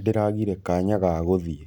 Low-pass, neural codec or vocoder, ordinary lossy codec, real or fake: 19.8 kHz; none; none; real